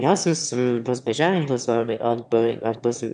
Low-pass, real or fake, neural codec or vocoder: 9.9 kHz; fake; autoencoder, 22.05 kHz, a latent of 192 numbers a frame, VITS, trained on one speaker